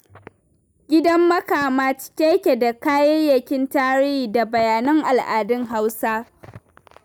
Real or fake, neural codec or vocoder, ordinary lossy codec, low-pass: real; none; none; none